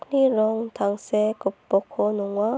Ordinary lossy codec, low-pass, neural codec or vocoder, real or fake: none; none; none; real